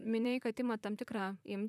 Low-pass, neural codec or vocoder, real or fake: 14.4 kHz; vocoder, 44.1 kHz, 128 mel bands, Pupu-Vocoder; fake